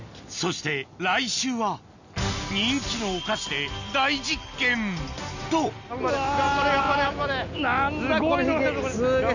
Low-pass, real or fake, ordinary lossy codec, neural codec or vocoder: 7.2 kHz; real; none; none